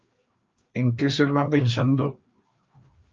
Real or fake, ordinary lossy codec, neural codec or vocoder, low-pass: fake; Opus, 24 kbps; codec, 16 kHz, 2 kbps, FreqCodec, larger model; 7.2 kHz